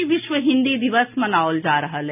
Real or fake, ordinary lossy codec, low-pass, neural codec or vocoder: real; none; 3.6 kHz; none